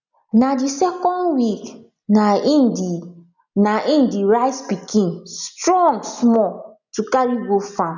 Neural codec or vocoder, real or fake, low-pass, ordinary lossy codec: none; real; 7.2 kHz; none